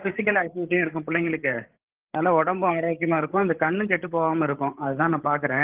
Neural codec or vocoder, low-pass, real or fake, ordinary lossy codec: codec, 16 kHz, 8 kbps, FreqCodec, larger model; 3.6 kHz; fake; Opus, 16 kbps